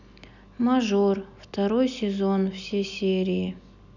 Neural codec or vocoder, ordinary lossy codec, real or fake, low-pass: none; none; real; 7.2 kHz